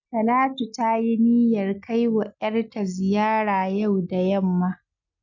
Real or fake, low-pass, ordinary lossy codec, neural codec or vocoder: real; 7.2 kHz; none; none